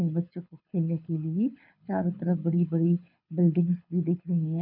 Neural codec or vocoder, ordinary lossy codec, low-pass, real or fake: codec, 24 kHz, 6 kbps, HILCodec; none; 5.4 kHz; fake